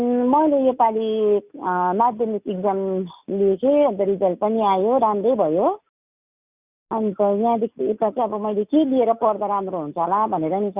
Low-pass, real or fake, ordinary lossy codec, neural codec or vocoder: 3.6 kHz; real; Opus, 64 kbps; none